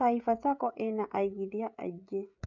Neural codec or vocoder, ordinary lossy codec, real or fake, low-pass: codec, 16 kHz, 16 kbps, FreqCodec, smaller model; none; fake; 7.2 kHz